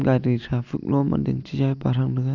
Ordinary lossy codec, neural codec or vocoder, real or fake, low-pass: none; none; real; 7.2 kHz